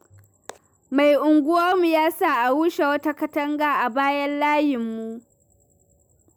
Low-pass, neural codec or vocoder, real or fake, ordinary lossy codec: none; none; real; none